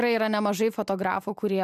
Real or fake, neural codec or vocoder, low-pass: real; none; 14.4 kHz